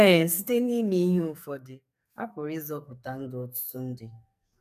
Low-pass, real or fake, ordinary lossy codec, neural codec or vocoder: 14.4 kHz; fake; none; codec, 44.1 kHz, 2.6 kbps, SNAC